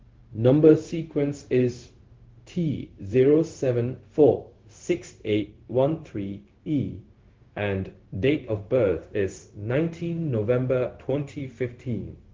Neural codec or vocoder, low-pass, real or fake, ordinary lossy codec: codec, 16 kHz, 0.4 kbps, LongCat-Audio-Codec; 7.2 kHz; fake; Opus, 16 kbps